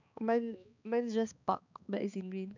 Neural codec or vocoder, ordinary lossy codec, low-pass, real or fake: codec, 16 kHz, 2 kbps, X-Codec, HuBERT features, trained on balanced general audio; none; 7.2 kHz; fake